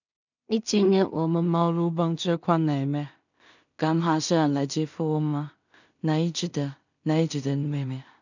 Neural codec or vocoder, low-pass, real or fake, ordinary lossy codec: codec, 16 kHz in and 24 kHz out, 0.4 kbps, LongCat-Audio-Codec, two codebook decoder; 7.2 kHz; fake; none